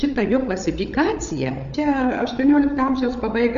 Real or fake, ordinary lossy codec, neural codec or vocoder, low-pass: fake; Opus, 64 kbps; codec, 16 kHz, 8 kbps, FreqCodec, larger model; 7.2 kHz